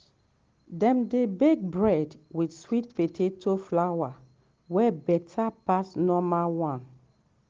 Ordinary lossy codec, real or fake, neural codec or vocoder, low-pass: Opus, 16 kbps; real; none; 7.2 kHz